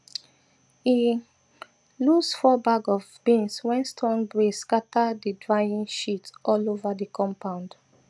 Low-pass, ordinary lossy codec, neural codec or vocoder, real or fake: none; none; none; real